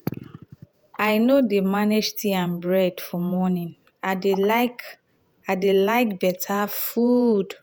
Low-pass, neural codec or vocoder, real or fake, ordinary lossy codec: none; vocoder, 48 kHz, 128 mel bands, Vocos; fake; none